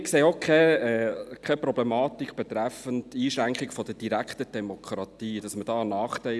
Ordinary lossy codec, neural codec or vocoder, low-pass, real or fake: none; none; none; real